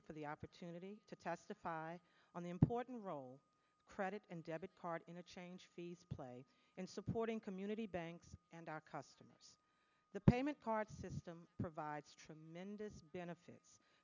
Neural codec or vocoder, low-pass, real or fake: none; 7.2 kHz; real